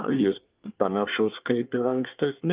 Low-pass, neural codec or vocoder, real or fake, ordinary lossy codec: 3.6 kHz; codec, 24 kHz, 1 kbps, SNAC; fake; Opus, 32 kbps